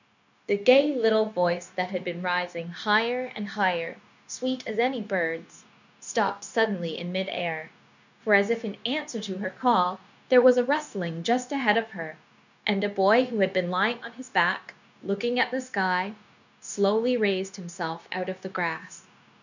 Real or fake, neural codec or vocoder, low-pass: fake; codec, 16 kHz, 0.9 kbps, LongCat-Audio-Codec; 7.2 kHz